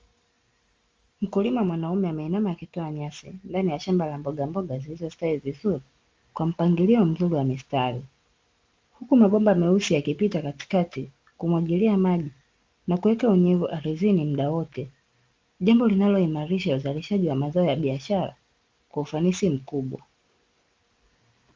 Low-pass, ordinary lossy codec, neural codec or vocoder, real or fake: 7.2 kHz; Opus, 32 kbps; none; real